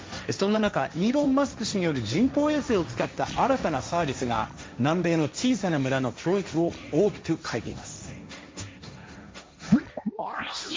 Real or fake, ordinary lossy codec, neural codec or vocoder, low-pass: fake; none; codec, 16 kHz, 1.1 kbps, Voila-Tokenizer; none